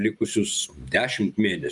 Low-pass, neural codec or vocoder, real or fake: 10.8 kHz; none; real